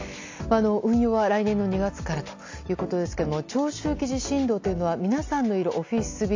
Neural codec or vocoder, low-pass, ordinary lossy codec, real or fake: none; 7.2 kHz; AAC, 48 kbps; real